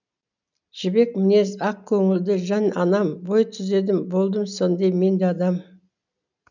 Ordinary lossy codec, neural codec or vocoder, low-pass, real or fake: none; none; 7.2 kHz; real